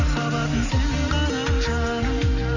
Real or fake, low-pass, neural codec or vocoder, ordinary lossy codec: real; 7.2 kHz; none; none